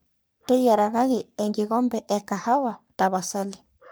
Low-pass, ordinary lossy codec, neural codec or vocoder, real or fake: none; none; codec, 44.1 kHz, 3.4 kbps, Pupu-Codec; fake